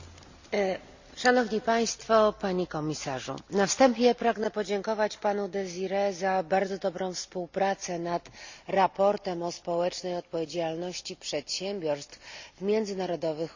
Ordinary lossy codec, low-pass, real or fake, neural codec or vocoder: Opus, 64 kbps; 7.2 kHz; real; none